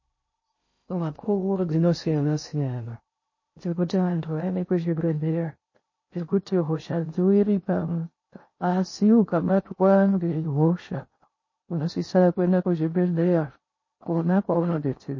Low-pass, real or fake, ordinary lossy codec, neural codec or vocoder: 7.2 kHz; fake; MP3, 32 kbps; codec, 16 kHz in and 24 kHz out, 0.6 kbps, FocalCodec, streaming, 2048 codes